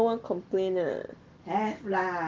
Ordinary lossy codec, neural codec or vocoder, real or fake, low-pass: Opus, 16 kbps; none; real; 7.2 kHz